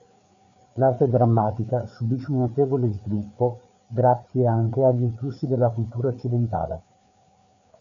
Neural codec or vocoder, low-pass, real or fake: codec, 16 kHz, 4 kbps, FreqCodec, larger model; 7.2 kHz; fake